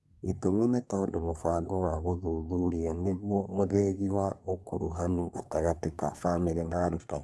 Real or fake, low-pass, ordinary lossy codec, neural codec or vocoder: fake; none; none; codec, 24 kHz, 1 kbps, SNAC